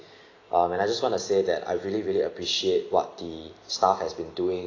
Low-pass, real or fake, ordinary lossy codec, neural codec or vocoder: 7.2 kHz; real; AAC, 32 kbps; none